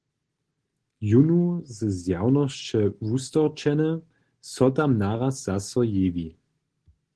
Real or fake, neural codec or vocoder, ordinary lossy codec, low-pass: real; none; Opus, 16 kbps; 10.8 kHz